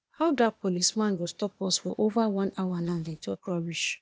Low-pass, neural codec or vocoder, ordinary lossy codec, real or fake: none; codec, 16 kHz, 0.8 kbps, ZipCodec; none; fake